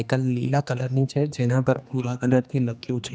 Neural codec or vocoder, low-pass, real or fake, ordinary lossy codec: codec, 16 kHz, 2 kbps, X-Codec, HuBERT features, trained on general audio; none; fake; none